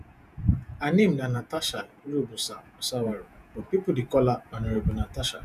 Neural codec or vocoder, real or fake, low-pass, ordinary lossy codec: vocoder, 48 kHz, 128 mel bands, Vocos; fake; 14.4 kHz; none